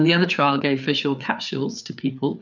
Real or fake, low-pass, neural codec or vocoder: fake; 7.2 kHz; codec, 16 kHz, 4 kbps, FreqCodec, larger model